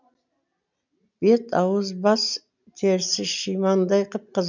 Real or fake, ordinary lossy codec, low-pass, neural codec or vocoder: real; none; 7.2 kHz; none